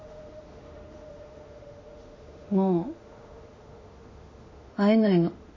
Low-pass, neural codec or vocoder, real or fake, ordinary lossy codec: 7.2 kHz; autoencoder, 48 kHz, 32 numbers a frame, DAC-VAE, trained on Japanese speech; fake; MP3, 32 kbps